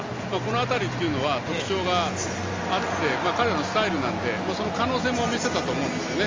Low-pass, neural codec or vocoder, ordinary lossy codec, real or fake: 7.2 kHz; none; Opus, 32 kbps; real